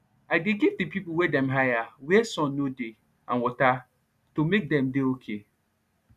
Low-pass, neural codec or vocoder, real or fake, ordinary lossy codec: 14.4 kHz; none; real; none